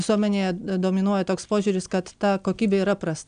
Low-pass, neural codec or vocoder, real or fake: 9.9 kHz; none; real